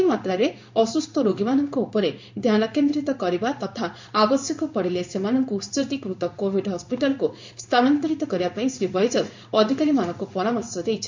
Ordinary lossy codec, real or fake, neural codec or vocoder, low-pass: MP3, 64 kbps; fake; codec, 16 kHz in and 24 kHz out, 1 kbps, XY-Tokenizer; 7.2 kHz